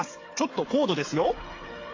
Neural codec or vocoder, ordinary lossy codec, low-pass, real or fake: codec, 16 kHz, 8 kbps, FreqCodec, smaller model; AAC, 32 kbps; 7.2 kHz; fake